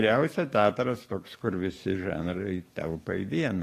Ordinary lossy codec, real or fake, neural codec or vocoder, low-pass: AAC, 48 kbps; fake; codec, 44.1 kHz, 7.8 kbps, DAC; 14.4 kHz